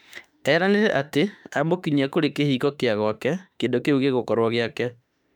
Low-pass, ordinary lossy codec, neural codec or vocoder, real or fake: 19.8 kHz; none; autoencoder, 48 kHz, 32 numbers a frame, DAC-VAE, trained on Japanese speech; fake